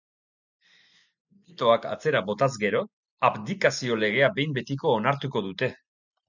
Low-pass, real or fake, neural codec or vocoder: 7.2 kHz; real; none